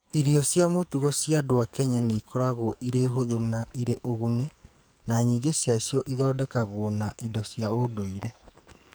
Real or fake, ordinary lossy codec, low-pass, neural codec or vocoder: fake; none; none; codec, 44.1 kHz, 2.6 kbps, SNAC